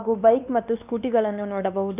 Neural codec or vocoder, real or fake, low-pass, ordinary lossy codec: codec, 16 kHz, 2 kbps, X-Codec, WavLM features, trained on Multilingual LibriSpeech; fake; 3.6 kHz; none